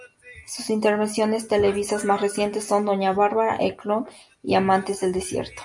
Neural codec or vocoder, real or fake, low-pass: none; real; 10.8 kHz